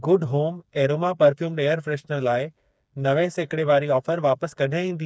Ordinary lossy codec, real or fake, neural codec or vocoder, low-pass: none; fake; codec, 16 kHz, 4 kbps, FreqCodec, smaller model; none